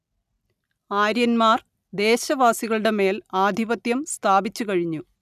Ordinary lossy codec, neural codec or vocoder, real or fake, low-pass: none; none; real; 14.4 kHz